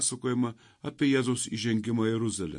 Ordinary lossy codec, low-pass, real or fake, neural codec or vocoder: MP3, 48 kbps; 10.8 kHz; real; none